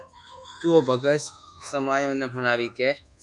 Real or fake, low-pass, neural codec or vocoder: fake; 10.8 kHz; codec, 24 kHz, 1.2 kbps, DualCodec